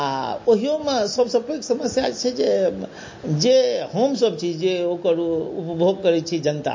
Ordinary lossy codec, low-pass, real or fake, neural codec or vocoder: MP3, 32 kbps; 7.2 kHz; real; none